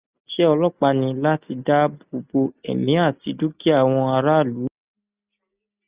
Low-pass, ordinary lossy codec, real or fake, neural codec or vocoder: 3.6 kHz; Opus, 64 kbps; real; none